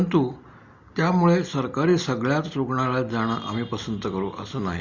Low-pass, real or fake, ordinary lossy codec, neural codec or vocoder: 7.2 kHz; real; Opus, 64 kbps; none